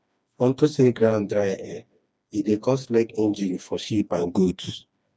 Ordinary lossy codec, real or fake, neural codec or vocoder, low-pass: none; fake; codec, 16 kHz, 2 kbps, FreqCodec, smaller model; none